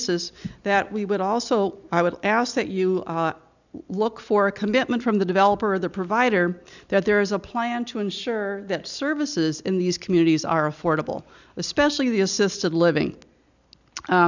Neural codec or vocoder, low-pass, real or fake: none; 7.2 kHz; real